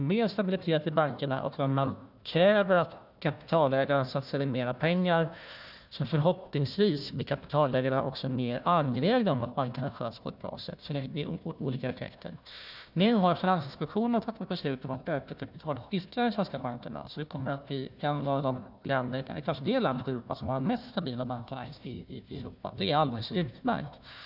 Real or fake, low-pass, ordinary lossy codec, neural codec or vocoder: fake; 5.4 kHz; none; codec, 16 kHz, 1 kbps, FunCodec, trained on Chinese and English, 50 frames a second